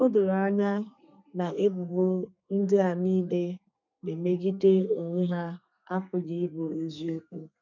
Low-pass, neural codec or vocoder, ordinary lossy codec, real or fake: 7.2 kHz; codec, 32 kHz, 1.9 kbps, SNAC; none; fake